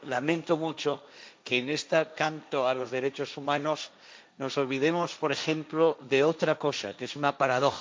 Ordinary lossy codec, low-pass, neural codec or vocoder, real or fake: none; none; codec, 16 kHz, 1.1 kbps, Voila-Tokenizer; fake